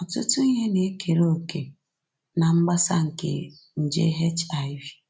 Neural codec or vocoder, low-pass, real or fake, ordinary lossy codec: none; none; real; none